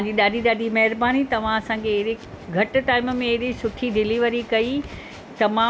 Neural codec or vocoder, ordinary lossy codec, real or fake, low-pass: none; none; real; none